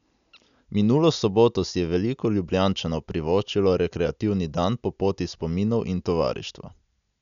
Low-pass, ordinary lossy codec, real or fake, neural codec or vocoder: 7.2 kHz; none; real; none